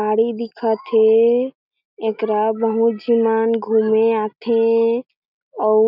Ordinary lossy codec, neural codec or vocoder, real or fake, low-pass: none; none; real; 5.4 kHz